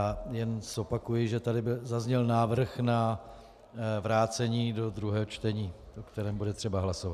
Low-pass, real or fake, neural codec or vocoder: 14.4 kHz; real; none